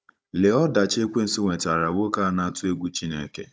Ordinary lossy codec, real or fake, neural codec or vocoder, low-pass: none; fake; codec, 16 kHz, 16 kbps, FunCodec, trained on Chinese and English, 50 frames a second; none